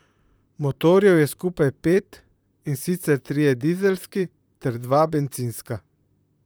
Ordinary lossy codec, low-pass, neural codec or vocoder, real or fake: none; none; vocoder, 44.1 kHz, 128 mel bands, Pupu-Vocoder; fake